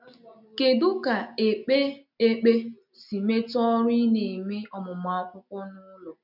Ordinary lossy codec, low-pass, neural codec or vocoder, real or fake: none; 5.4 kHz; none; real